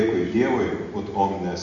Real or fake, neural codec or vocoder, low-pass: real; none; 7.2 kHz